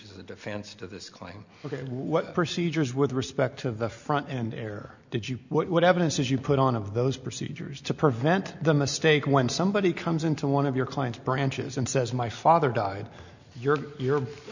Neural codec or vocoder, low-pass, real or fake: none; 7.2 kHz; real